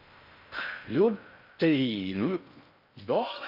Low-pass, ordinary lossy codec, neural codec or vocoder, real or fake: 5.4 kHz; none; codec, 16 kHz in and 24 kHz out, 0.6 kbps, FocalCodec, streaming, 4096 codes; fake